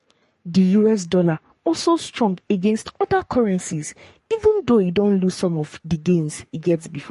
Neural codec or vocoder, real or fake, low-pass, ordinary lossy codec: codec, 44.1 kHz, 3.4 kbps, Pupu-Codec; fake; 14.4 kHz; MP3, 48 kbps